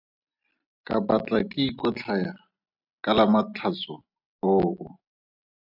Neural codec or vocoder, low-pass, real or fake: none; 5.4 kHz; real